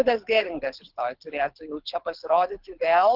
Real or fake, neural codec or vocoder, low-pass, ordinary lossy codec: fake; codec, 24 kHz, 6 kbps, HILCodec; 5.4 kHz; Opus, 16 kbps